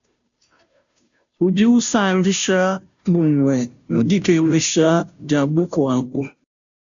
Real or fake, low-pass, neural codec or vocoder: fake; 7.2 kHz; codec, 16 kHz, 0.5 kbps, FunCodec, trained on Chinese and English, 25 frames a second